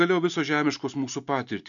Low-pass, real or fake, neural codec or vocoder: 7.2 kHz; real; none